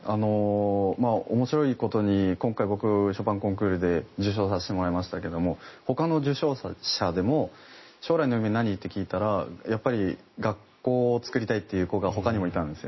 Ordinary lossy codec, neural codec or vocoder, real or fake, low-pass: MP3, 24 kbps; none; real; 7.2 kHz